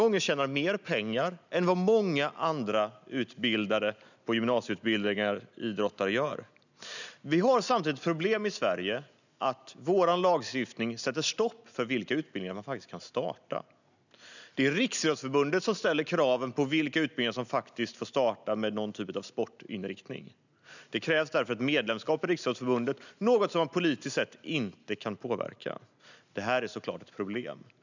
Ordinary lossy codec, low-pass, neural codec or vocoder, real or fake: none; 7.2 kHz; none; real